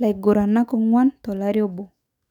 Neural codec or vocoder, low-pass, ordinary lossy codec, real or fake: autoencoder, 48 kHz, 128 numbers a frame, DAC-VAE, trained on Japanese speech; 19.8 kHz; none; fake